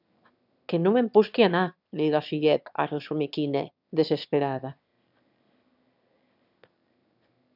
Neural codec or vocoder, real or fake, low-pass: autoencoder, 22.05 kHz, a latent of 192 numbers a frame, VITS, trained on one speaker; fake; 5.4 kHz